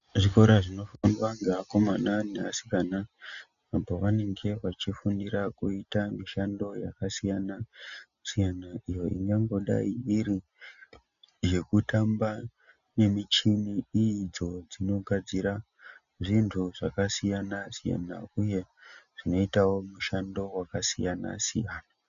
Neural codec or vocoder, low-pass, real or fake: none; 7.2 kHz; real